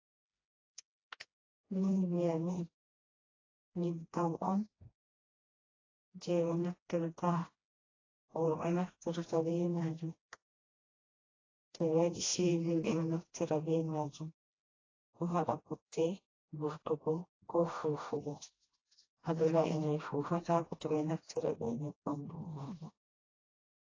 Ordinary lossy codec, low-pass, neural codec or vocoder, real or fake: AAC, 32 kbps; 7.2 kHz; codec, 16 kHz, 1 kbps, FreqCodec, smaller model; fake